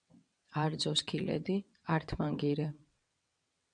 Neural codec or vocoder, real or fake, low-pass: vocoder, 22.05 kHz, 80 mel bands, WaveNeXt; fake; 9.9 kHz